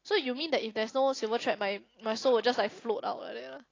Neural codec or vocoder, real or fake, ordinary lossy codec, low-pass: none; real; AAC, 32 kbps; 7.2 kHz